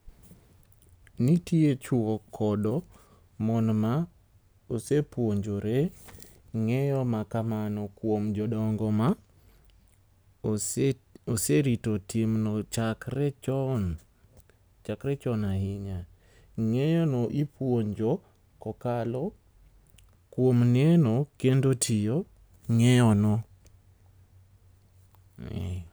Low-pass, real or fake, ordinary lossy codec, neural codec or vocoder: none; real; none; none